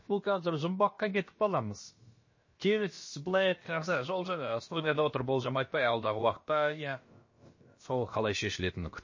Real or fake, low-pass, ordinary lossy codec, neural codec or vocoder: fake; 7.2 kHz; MP3, 32 kbps; codec, 16 kHz, about 1 kbps, DyCAST, with the encoder's durations